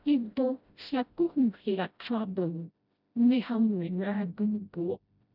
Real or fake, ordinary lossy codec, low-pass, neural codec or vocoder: fake; none; 5.4 kHz; codec, 16 kHz, 0.5 kbps, FreqCodec, smaller model